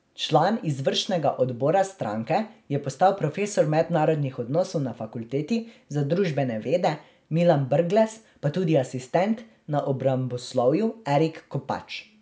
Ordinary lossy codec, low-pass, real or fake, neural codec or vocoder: none; none; real; none